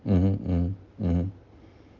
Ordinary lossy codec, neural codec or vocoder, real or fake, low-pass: Opus, 24 kbps; none; real; 7.2 kHz